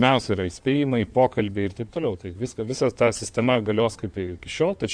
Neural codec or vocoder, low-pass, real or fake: codec, 16 kHz in and 24 kHz out, 2.2 kbps, FireRedTTS-2 codec; 9.9 kHz; fake